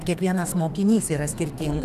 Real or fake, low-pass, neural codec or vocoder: fake; 14.4 kHz; codec, 32 kHz, 1.9 kbps, SNAC